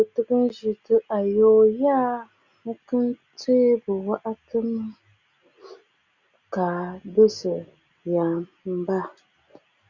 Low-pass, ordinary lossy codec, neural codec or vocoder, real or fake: 7.2 kHz; Opus, 64 kbps; none; real